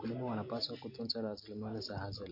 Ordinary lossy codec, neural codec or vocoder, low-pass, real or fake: MP3, 32 kbps; none; 5.4 kHz; real